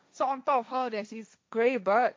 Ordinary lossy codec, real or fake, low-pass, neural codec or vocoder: none; fake; none; codec, 16 kHz, 1.1 kbps, Voila-Tokenizer